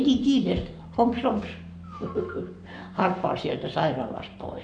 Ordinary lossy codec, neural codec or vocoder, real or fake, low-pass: none; codec, 44.1 kHz, 7.8 kbps, Pupu-Codec; fake; 9.9 kHz